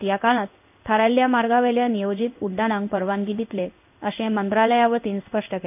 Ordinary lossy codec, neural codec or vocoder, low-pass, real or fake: none; codec, 16 kHz in and 24 kHz out, 1 kbps, XY-Tokenizer; 3.6 kHz; fake